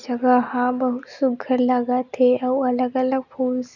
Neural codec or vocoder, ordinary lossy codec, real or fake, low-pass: none; none; real; 7.2 kHz